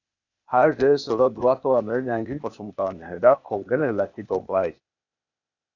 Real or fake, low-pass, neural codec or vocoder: fake; 7.2 kHz; codec, 16 kHz, 0.8 kbps, ZipCodec